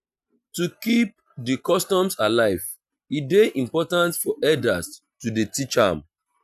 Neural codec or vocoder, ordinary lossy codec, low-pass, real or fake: none; none; 14.4 kHz; real